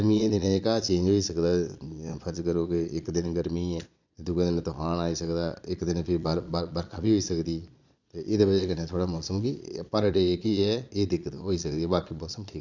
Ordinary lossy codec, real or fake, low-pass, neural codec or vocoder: none; fake; 7.2 kHz; vocoder, 22.05 kHz, 80 mel bands, Vocos